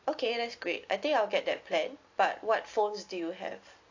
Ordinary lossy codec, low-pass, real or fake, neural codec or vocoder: AAC, 48 kbps; 7.2 kHz; real; none